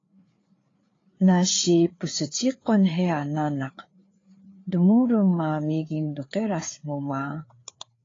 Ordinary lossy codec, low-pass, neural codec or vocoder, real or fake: AAC, 32 kbps; 7.2 kHz; codec, 16 kHz, 4 kbps, FreqCodec, larger model; fake